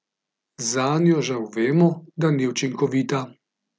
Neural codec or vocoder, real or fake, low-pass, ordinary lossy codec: none; real; none; none